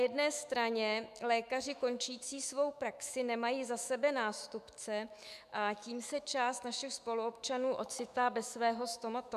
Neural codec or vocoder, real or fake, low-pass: autoencoder, 48 kHz, 128 numbers a frame, DAC-VAE, trained on Japanese speech; fake; 14.4 kHz